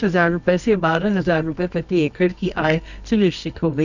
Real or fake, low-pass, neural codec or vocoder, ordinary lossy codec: fake; 7.2 kHz; codec, 24 kHz, 0.9 kbps, WavTokenizer, medium music audio release; none